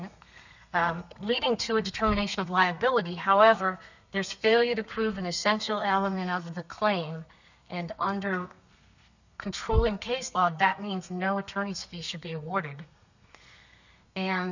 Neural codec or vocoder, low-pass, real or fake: codec, 32 kHz, 1.9 kbps, SNAC; 7.2 kHz; fake